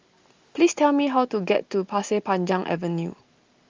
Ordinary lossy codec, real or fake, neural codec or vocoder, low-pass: Opus, 32 kbps; real; none; 7.2 kHz